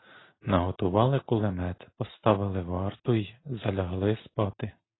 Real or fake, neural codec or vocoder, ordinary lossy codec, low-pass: real; none; AAC, 16 kbps; 7.2 kHz